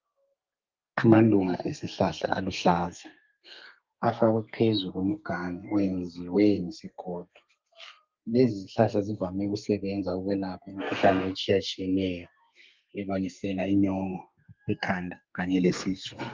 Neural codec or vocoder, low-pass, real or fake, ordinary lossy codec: codec, 32 kHz, 1.9 kbps, SNAC; 7.2 kHz; fake; Opus, 24 kbps